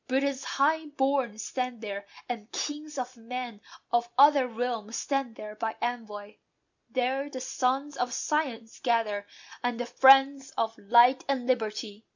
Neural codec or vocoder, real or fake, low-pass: none; real; 7.2 kHz